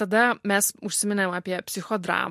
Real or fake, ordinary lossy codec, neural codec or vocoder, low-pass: real; MP3, 64 kbps; none; 14.4 kHz